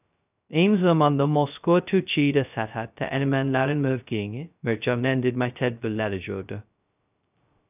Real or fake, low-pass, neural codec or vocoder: fake; 3.6 kHz; codec, 16 kHz, 0.2 kbps, FocalCodec